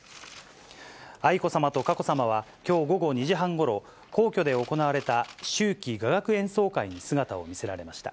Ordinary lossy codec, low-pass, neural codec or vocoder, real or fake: none; none; none; real